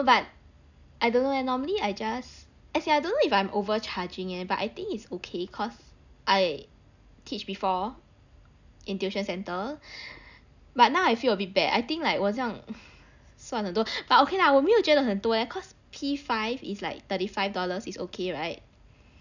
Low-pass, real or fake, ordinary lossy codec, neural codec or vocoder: 7.2 kHz; real; none; none